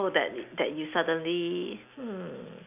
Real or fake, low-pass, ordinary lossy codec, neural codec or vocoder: real; 3.6 kHz; none; none